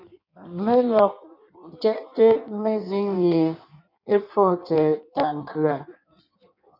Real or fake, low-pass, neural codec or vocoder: fake; 5.4 kHz; codec, 16 kHz in and 24 kHz out, 1.1 kbps, FireRedTTS-2 codec